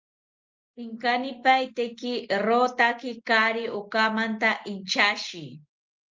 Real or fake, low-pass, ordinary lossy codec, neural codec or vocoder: real; 7.2 kHz; Opus, 24 kbps; none